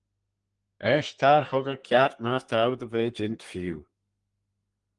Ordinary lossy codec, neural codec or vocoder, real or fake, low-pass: Opus, 24 kbps; codec, 32 kHz, 1.9 kbps, SNAC; fake; 10.8 kHz